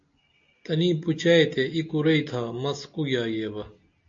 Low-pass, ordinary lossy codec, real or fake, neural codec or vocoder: 7.2 kHz; MP3, 48 kbps; real; none